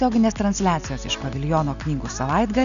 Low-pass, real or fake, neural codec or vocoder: 7.2 kHz; real; none